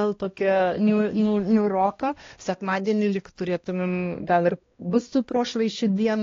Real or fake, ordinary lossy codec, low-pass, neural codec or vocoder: fake; AAC, 32 kbps; 7.2 kHz; codec, 16 kHz, 1 kbps, X-Codec, HuBERT features, trained on balanced general audio